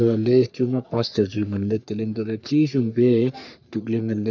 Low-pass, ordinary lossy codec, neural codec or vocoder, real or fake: 7.2 kHz; none; codec, 44.1 kHz, 3.4 kbps, Pupu-Codec; fake